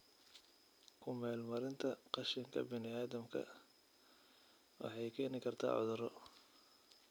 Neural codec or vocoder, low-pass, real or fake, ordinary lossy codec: none; none; real; none